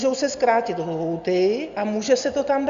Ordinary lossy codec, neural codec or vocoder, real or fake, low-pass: Opus, 64 kbps; none; real; 7.2 kHz